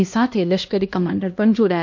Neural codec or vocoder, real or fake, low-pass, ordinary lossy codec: codec, 16 kHz, 1 kbps, X-Codec, HuBERT features, trained on LibriSpeech; fake; 7.2 kHz; MP3, 48 kbps